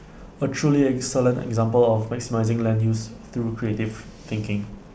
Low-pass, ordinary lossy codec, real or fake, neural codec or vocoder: none; none; real; none